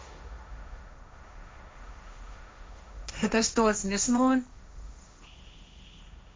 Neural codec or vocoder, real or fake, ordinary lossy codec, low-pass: codec, 16 kHz, 1.1 kbps, Voila-Tokenizer; fake; none; none